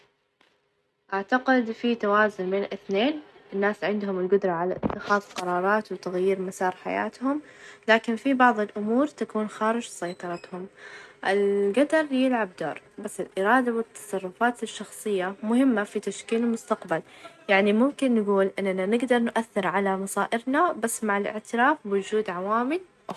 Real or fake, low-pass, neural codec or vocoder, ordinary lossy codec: real; none; none; none